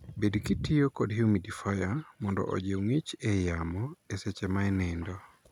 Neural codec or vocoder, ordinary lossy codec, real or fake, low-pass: none; none; real; 19.8 kHz